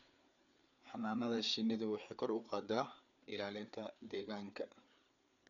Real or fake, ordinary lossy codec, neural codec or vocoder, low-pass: fake; none; codec, 16 kHz, 4 kbps, FreqCodec, larger model; 7.2 kHz